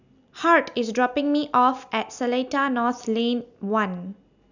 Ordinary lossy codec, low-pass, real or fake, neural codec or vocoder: none; 7.2 kHz; real; none